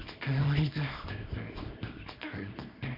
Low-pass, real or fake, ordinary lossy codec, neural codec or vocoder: 5.4 kHz; fake; none; codec, 24 kHz, 0.9 kbps, WavTokenizer, small release